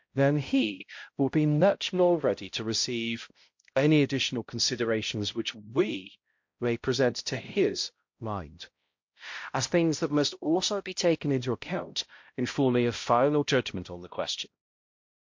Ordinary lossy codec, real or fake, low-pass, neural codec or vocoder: MP3, 48 kbps; fake; 7.2 kHz; codec, 16 kHz, 0.5 kbps, X-Codec, HuBERT features, trained on balanced general audio